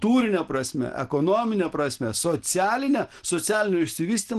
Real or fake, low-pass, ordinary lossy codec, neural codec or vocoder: real; 10.8 kHz; Opus, 16 kbps; none